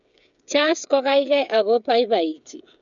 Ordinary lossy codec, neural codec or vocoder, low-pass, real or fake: none; codec, 16 kHz, 8 kbps, FreqCodec, smaller model; 7.2 kHz; fake